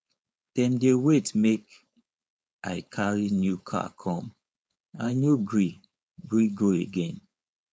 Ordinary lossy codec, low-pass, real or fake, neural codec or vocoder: none; none; fake; codec, 16 kHz, 4.8 kbps, FACodec